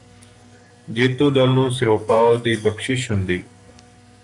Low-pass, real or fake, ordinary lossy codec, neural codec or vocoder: 10.8 kHz; fake; MP3, 96 kbps; codec, 44.1 kHz, 2.6 kbps, SNAC